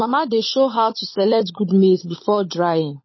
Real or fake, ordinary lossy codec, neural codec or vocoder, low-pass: fake; MP3, 24 kbps; codec, 16 kHz, 16 kbps, FunCodec, trained on LibriTTS, 50 frames a second; 7.2 kHz